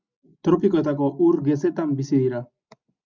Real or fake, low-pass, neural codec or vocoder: real; 7.2 kHz; none